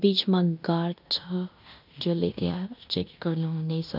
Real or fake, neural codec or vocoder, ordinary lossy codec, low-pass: fake; codec, 16 kHz in and 24 kHz out, 0.9 kbps, LongCat-Audio-Codec, fine tuned four codebook decoder; none; 5.4 kHz